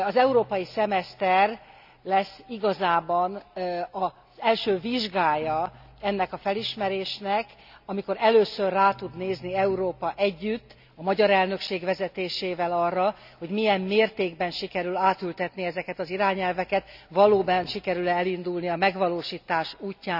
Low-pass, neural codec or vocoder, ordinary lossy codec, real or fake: 5.4 kHz; none; none; real